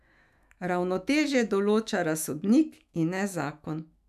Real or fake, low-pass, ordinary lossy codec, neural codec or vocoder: fake; 14.4 kHz; none; autoencoder, 48 kHz, 128 numbers a frame, DAC-VAE, trained on Japanese speech